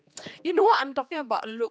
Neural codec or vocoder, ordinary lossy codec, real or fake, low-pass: codec, 16 kHz, 2 kbps, X-Codec, HuBERT features, trained on general audio; none; fake; none